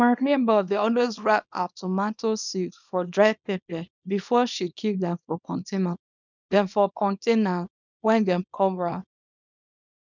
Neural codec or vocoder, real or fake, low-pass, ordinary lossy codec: codec, 24 kHz, 0.9 kbps, WavTokenizer, small release; fake; 7.2 kHz; none